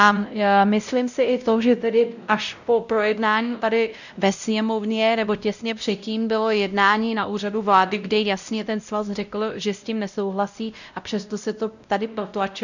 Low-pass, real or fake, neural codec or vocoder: 7.2 kHz; fake; codec, 16 kHz, 0.5 kbps, X-Codec, WavLM features, trained on Multilingual LibriSpeech